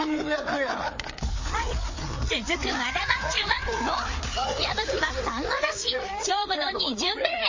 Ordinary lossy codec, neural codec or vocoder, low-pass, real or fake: MP3, 32 kbps; codec, 16 kHz, 4 kbps, FreqCodec, larger model; 7.2 kHz; fake